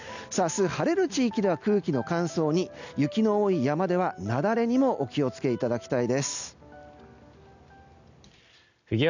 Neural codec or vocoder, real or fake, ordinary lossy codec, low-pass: none; real; none; 7.2 kHz